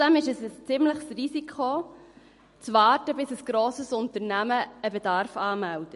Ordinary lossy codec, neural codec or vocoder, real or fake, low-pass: MP3, 48 kbps; none; real; 14.4 kHz